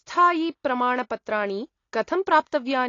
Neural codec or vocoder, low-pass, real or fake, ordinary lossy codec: none; 7.2 kHz; real; AAC, 32 kbps